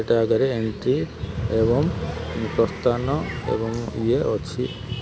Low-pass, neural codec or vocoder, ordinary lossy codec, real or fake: none; none; none; real